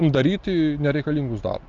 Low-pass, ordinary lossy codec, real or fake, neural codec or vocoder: 7.2 kHz; Opus, 24 kbps; real; none